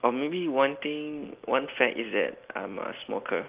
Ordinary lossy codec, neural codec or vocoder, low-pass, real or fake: Opus, 32 kbps; none; 3.6 kHz; real